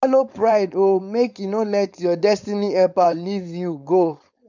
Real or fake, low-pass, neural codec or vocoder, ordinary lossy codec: fake; 7.2 kHz; codec, 16 kHz, 4.8 kbps, FACodec; none